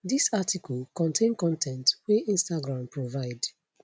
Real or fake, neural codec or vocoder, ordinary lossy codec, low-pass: real; none; none; none